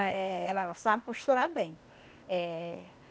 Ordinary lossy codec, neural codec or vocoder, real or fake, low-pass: none; codec, 16 kHz, 0.8 kbps, ZipCodec; fake; none